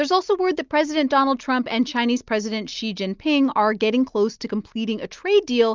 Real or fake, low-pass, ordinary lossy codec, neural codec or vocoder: real; 7.2 kHz; Opus, 32 kbps; none